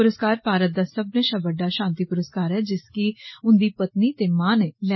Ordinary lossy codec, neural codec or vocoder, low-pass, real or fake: MP3, 24 kbps; none; 7.2 kHz; real